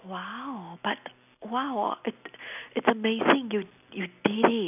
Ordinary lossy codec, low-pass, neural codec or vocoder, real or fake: none; 3.6 kHz; none; real